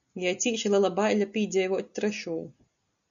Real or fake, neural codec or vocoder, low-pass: real; none; 7.2 kHz